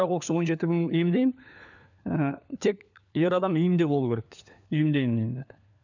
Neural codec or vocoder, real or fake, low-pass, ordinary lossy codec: codec, 16 kHz, 4 kbps, FunCodec, trained on LibriTTS, 50 frames a second; fake; 7.2 kHz; none